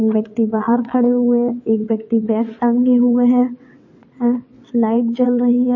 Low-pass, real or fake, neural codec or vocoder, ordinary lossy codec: 7.2 kHz; fake; vocoder, 22.05 kHz, 80 mel bands, WaveNeXt; MP3, 32 kbps